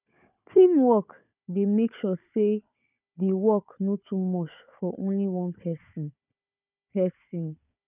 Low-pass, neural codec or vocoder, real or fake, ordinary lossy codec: 3.6 kHz; codec, 16 kHz, 4 kbps, FunCodec, trained on Chinese and English, 50 frames a second; fake; none